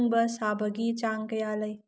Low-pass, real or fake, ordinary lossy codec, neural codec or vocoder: none; real; none; none